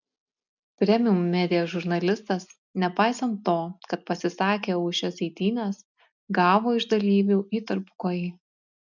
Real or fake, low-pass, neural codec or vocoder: real; 7.2 kHz; none